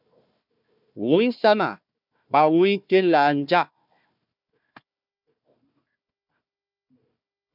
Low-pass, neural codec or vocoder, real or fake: 5.4 kHz; codec, 16 kHz, 1 kbps, FunCodec, trained on Chinese and English, 50 frames a second; fake